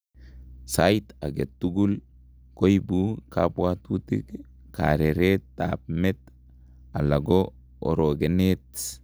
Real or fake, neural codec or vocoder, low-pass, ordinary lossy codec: real; none; none; none